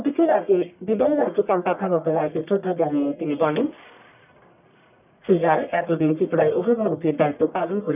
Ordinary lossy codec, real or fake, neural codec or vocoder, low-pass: none; fake; codec, 44.1 kHz, 1.7 kbps, Pupu-Codec; 3.6 kHz